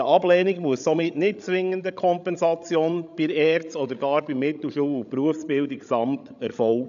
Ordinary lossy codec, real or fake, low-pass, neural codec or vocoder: none; fake; 7.2 kHz; codec, 16 kHz, 16 kbps, FreqCodec, larger model